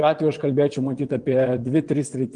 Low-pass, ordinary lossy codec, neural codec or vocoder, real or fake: 9.9 kHz; Opus, 24 kbps; vocoder, 22.05 kHz, 80 mel bands, WaveNeXt; fake